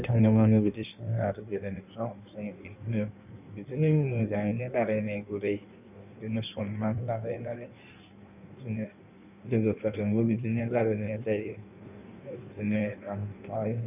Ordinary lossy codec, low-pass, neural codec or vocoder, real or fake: none; 3.6 kHz; codec, 16 kHz in and 24 kHz out, 1.1 kbps, FireRedTTS-2 codec; fake